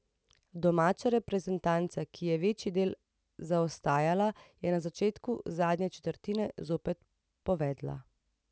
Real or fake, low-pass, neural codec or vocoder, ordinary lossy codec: real; none; none; none